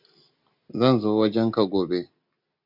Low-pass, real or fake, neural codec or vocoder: 5.4 kHz; real; none